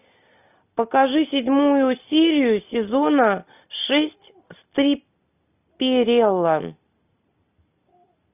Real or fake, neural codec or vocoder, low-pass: real; none; 3.6 kHz